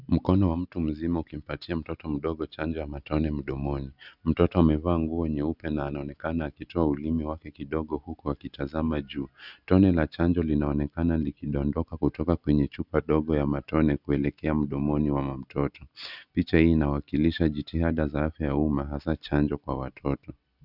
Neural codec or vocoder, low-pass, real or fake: none; 5.4 kHz; real